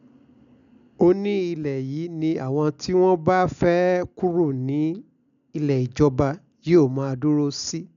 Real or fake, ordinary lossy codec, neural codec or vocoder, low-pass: real; none; none; 7.2 kHz